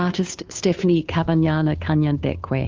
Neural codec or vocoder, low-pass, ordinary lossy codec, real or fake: codec, 16 kHz, 2 kbps, FunCodec, trained on Chinese and English, 25 frames a second; 7.2 kHz; Opus, 32 kbps; fake